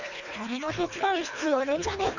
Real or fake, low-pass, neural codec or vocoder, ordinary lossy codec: fake; 7.2 kHz; codec, 24 kHz, 3 kbps, HILCodec; none